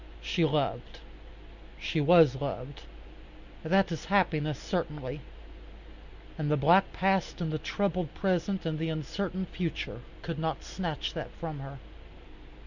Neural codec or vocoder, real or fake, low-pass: none; real; 7.2 kHz